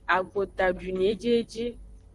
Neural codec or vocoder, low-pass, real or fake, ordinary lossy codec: vocoder, 44.1 kHz, 128 mel bands, Pupu-Vocoder; 10.8 kHz; fake; Opus, 24 kbps